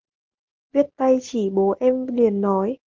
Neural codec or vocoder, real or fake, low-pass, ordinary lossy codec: none; real; 7.2 kHz; Opus, 16 kbps